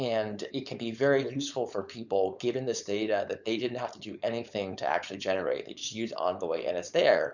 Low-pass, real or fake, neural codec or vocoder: 7.2 kHz; fake; codec, 16 kHz, 4.8 kbps, FACodec